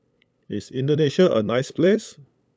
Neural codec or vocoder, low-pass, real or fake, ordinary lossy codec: codec, 16 kHz, 8 kbps, FunCodec, trained on LibriTTS, 25 frames a second; none; fake; none